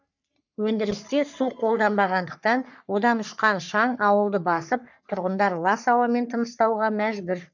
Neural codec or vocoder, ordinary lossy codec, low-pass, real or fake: codec, 44.1 kHz, 3.4 kbps, Pupu-Codec; none; 7.2 kHz; fake